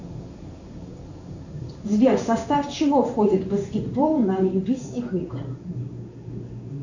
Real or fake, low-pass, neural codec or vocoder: fake; 7.2 kHz; codec, 16 kHz in and 24 kHz out, 1 kbps, XY-Tokenizer